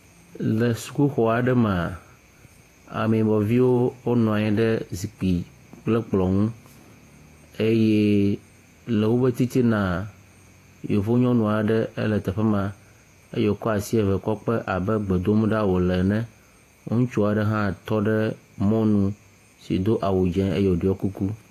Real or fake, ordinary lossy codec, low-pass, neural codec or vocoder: fake; AAC, 48 kbps; 14.4 kHz; vocoder, 48 kHz, 128 mel bands, Vocos